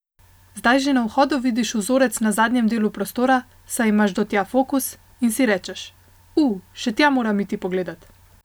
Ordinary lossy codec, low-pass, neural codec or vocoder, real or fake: none; none; none; real